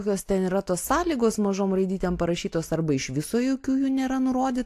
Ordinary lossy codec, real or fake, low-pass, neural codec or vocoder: AAC, 64 kbps; real; 14.4 kHz; none